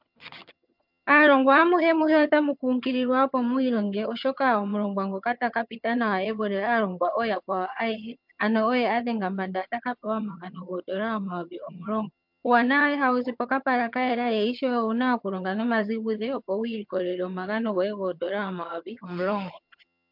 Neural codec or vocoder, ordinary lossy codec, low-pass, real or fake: vocoder, 22.05 kHz, 80 mel bands, HiFi-GAN; MP3, 48 kbps; 5.4 kHz; fake